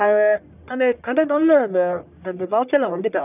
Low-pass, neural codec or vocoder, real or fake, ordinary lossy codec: 3.6 kHz; codec, 44.1 kHz, 1.7 kbps, Pupu-Codec; fake; none